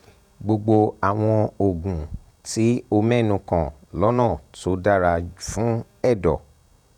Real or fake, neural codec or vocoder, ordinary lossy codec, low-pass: fake; vocoder, 48 kHz, 128 mel bands, Vocos; none; 19.8 kHz